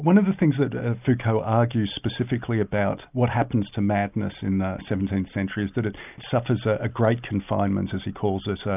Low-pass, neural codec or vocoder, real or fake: 3.6 kHz; none; real